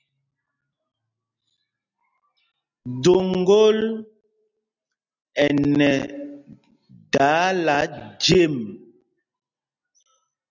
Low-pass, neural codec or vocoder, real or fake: 7.2 kHz; none; real